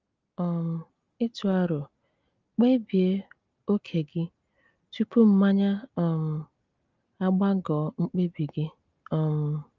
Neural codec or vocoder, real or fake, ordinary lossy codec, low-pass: none; real; Opus, 32 kbps; 7.2 kHz